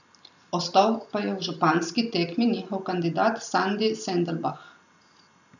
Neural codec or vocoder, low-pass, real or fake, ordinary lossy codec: none; 7.2 kHz; real; none